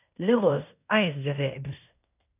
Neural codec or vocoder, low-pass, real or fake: codec, 16 kHz in and 24 kHz out, 0.9 kbps, LongCat-Audio-Codec, fine tuned four codebook decoder; 3.6 kHz; fake